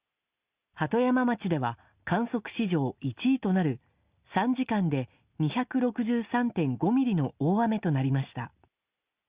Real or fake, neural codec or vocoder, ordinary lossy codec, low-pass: real; none; Opus, 64 kbps; 3.6 kHz